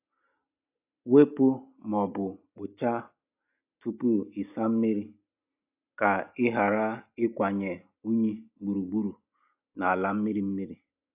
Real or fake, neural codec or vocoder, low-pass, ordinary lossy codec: real; none; 3.6 kHz; AAC, 32 kbps